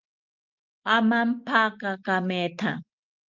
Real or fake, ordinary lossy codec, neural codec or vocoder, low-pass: real; Opus, 24 kbps; none; 7.2 kHz